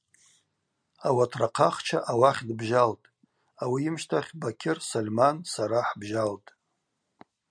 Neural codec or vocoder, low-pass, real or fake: none; 9.9 kHz; real